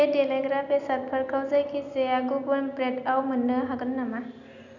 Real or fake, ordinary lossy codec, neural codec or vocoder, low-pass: real; none; none; 7.2 kHz